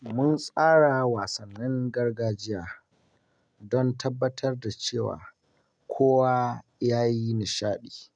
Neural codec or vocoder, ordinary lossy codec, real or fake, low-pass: none; none; real; 9.9 kHz